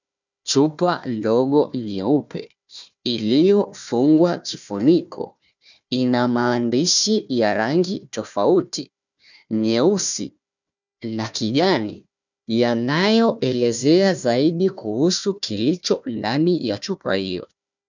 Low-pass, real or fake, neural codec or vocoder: 7.2 kHz; fake; codec, 16 kHz, 1 kbps, FunCodec, trained on Chinese and English, 50 frames a second